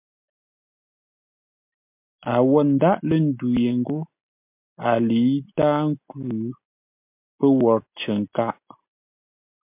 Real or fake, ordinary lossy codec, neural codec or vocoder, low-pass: real; MP3, 32 kbps; none; 3.6 kHz